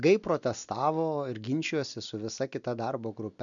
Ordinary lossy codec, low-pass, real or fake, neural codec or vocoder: MP3, 96 kbps; 7.2 kHz; real; none